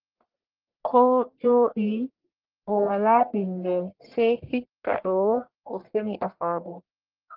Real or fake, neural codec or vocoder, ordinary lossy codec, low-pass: fake; codec, 44.1 kHz, 1.7 kbps, Pupu-Codec; Opus, 16 kbps; 5.4 kHz